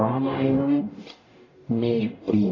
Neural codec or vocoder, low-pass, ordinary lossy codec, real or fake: codec, 44.1 kHz, 0.9 kbps, DAC; 7.2 kHz; AAC, 32 kbps; fake